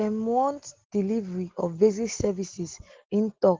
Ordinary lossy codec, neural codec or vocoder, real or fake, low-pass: Opus, 16 kbps; none; real; 7.2 kHz